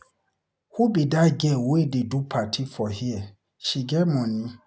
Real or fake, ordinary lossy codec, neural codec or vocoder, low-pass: real; none; none; none